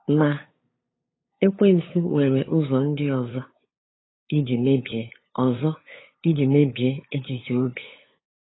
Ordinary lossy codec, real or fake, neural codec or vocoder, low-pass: AAC, 16 kbps; fake; codec, 16 kHz, 8 kbps, FunCodec, trained on LibriTTS, 25 frames a second; 7.2 kHz